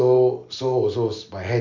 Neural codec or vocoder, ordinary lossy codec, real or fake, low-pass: none; none; real; 7.2 kHz